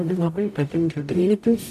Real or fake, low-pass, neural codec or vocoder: fake; 14.4 kHz; codec, 44.1 kHz, 0.9 kbps, DAC